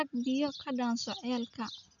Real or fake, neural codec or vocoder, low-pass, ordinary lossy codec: real; none; 7.2 kHz; none